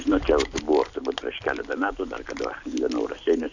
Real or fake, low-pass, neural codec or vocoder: real; 7.2 kHz; none